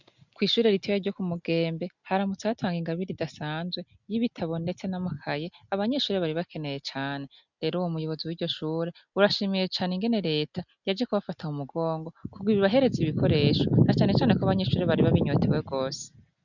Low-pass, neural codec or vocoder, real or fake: 7.2 kHz; none; real